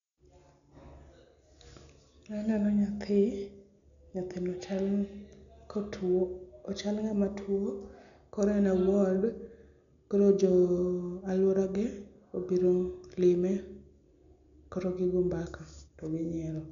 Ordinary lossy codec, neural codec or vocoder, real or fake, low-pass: none; none; real; 7.2 kHz